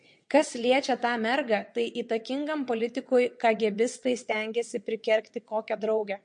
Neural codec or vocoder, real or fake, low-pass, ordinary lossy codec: vocoder, 22.05 kHz, 80 mel bands, WaveNeXt; fake; 9.9 kHz; MP3, 48 kbps